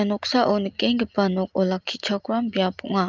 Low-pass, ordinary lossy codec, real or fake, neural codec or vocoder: 7.2 kHz; Opus, 24 kbps; real; none